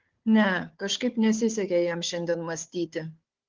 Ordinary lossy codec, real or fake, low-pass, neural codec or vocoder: Opus, 16 kbps; fake; 7.2 kHz; codec, 16 kHz, 4 kbps, FunCodec, trained on Chinese and English, 50 frames a second